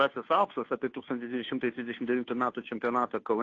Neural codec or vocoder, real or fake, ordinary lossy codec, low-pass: codec, 16 kHz, 2 kbps, FunCodec, trained on Chinese and English, 25 frames a second; fake; AAC, 32 kbps; 7.2 kHz